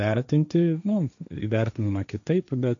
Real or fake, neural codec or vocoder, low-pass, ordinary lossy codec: fake; codec, 16 kHz, 1.1 kbps, Voila-Tokenizer; 7.2 kHz; MP3, 64 kbps